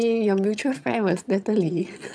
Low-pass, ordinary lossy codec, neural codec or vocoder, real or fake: none; none; vocoder, 22.05 kHz, 80 mel bands, HiFi-GAN; fake